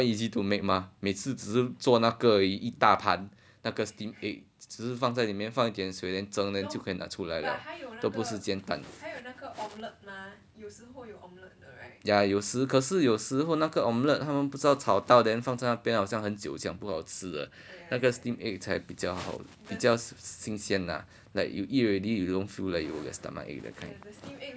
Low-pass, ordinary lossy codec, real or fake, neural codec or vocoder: none; none; real; none